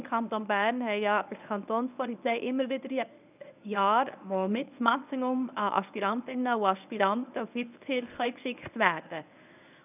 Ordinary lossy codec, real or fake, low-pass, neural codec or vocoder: none; fake; 3.6 kHz; codec, 24 kHz, 0.9 kbps, WavTokenizer, medium speech release version 1